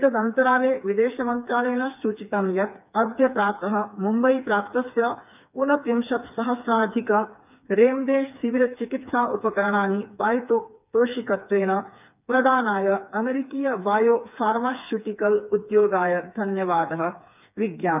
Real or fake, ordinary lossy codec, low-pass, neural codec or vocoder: fake; none; 3.6 kHz; codec, 16 kHz, 4 kbps, FreqCodec, smaller model